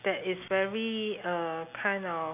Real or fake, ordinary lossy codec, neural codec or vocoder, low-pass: real; none; none; 3.6 kHz